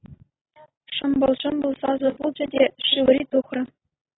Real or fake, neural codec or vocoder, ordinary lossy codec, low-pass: real; none; AAC, 16 kbps; 7.2 kHz